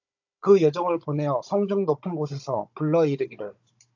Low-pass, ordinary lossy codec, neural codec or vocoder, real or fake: 7.2 kHz; AAC, 48 kbps; codec, 16 kHz, 16 kbps, FunCodec, trained on Chinese and English, 50 frames a second; fake